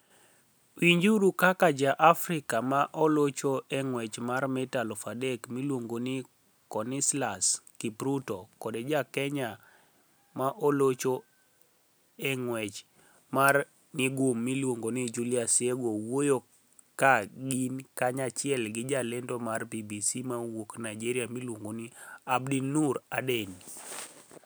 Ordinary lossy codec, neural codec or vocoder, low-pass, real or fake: none; none; none; real